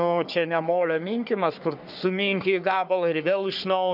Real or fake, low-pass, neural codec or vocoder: fake; 5.4 kHz; codec, 44.1 kHz, 3.4 kbps, Pupu-Codec